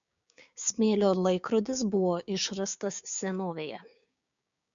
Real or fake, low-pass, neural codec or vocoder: fake; 7.2 kHz; codec, 16 kHz, 6 kbps, DAC